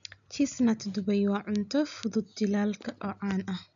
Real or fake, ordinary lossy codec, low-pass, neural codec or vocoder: real; none; 7.2 kHz; none